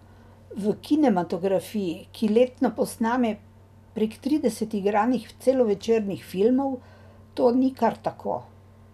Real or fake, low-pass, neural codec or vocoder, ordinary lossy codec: real; 14.4 kHz; none; none